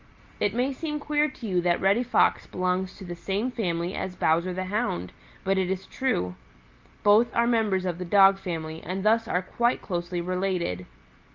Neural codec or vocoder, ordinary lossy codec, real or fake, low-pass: none; Opus, 32 kbps; real; 7.2 kHz